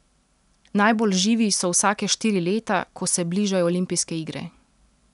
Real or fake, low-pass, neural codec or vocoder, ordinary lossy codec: real; 10.8 kHz; none; none